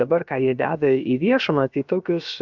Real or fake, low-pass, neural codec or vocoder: fake; 7.2 kHz; codec, 16 kHz, about 1 kbps, DyCAST, with the encoder's durations